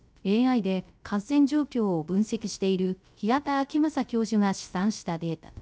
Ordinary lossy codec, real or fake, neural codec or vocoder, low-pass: none; fake; codec, 16 kHz, 0.3 kbps, FocalCodec; none